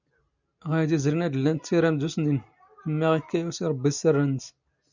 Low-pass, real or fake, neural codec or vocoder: 7.2 kHz; real; none